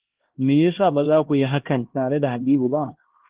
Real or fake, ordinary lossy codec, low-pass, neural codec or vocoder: fake; Opus, 16 kbps; 3.6 kHz; codec, 16 kHz, 1 kbps, X-Codec, HuBERT features, trained on LibriSpeech